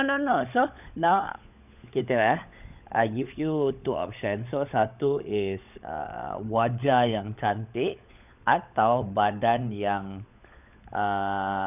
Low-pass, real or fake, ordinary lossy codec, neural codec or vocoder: 3.6 kHz; fake; none; codec, 16 kHz, 16 kbps, FunCodec, trained on LibriTTS, 50 frames a second